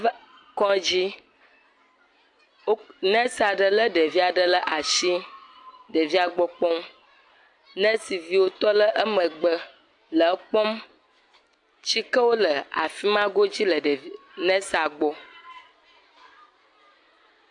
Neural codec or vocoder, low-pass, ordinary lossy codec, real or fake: none; 10.8 kHz; AAC, 64 kbps; real